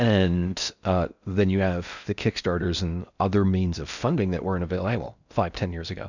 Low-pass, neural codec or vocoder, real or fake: 7.2 kHz; codec, 16 kHz in and 24 kHz out, 0.6 kbps, FocalCodec, streaming, 4096 codes; fake